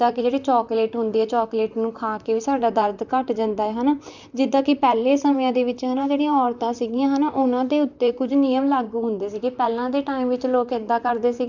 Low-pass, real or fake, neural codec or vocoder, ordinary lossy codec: 7.2 kHz; fake; vocoder, 44.1 kHz, 128 mel bands, Pupu-Vocoder; none